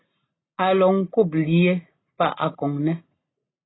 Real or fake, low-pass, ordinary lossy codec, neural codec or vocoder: real; 7.2 kHz; AAC, 16 kbps; none